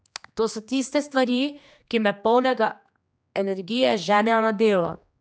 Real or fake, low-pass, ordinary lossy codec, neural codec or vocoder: fake; none; none; codec, 16 kHz, 2 kbps, X-Codec, HuBERT features, trained on general audio